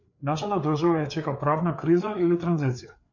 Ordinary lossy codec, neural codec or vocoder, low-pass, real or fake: MP3, 48 kbps; codec, 16 kHz, 4 kbps, FreqCodec, larger model; 7.2 kHz; fake